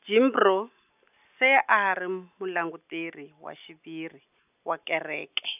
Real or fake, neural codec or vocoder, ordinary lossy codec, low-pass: real; none; none; 3.6 kHz